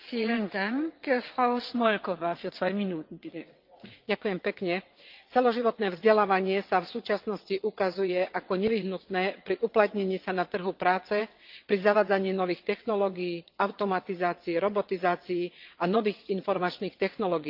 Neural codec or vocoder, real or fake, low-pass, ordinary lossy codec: vocoder, 22.05 kHz, 80 mel bands, WaveNeXt; fake; 5.4 kHz; Opus, 24 kbps